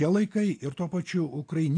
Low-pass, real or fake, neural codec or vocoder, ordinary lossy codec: 9.9 kHz; real; none; AAC, 48 kbps